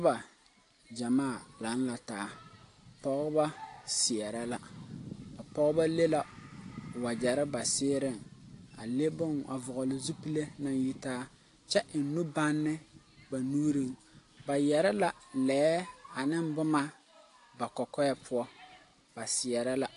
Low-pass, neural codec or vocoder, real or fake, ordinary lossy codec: 10.8 kHz; none; real; AAC, 48 kbps